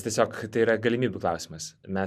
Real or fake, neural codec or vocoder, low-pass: real; none; 14.4 kHz